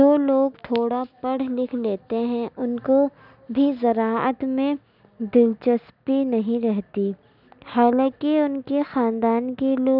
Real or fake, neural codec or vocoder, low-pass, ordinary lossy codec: real; none; 5.4 kHz; none